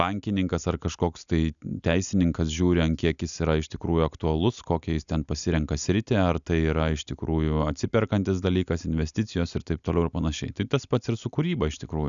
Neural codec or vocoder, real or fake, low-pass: none; real; 7.2 kHz